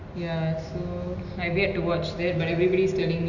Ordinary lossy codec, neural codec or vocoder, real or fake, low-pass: none; none; real; 7.2 kHz